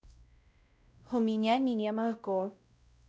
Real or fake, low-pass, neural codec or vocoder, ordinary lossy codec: fake; none; codec, 16 kHz, 0.5 kbps, X-Codec, WavLM features, trained on Multilingual LibriSpeech; none